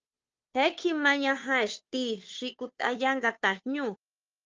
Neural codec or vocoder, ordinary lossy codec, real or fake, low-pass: codec, 16 kHz, 2 kbps, FunCodec, trained on Chinese and English, 25 frames a second; Opus, 24 kbps; fake; 7.2 kHz